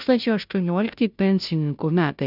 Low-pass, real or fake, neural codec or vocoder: 5.4 kHz; fake; codec, 16 kHz, 0.5 kbps, FunCodec, trained on Chinese and English, 25 frames a second